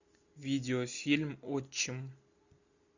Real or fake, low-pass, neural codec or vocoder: real; 7.2 kHz; none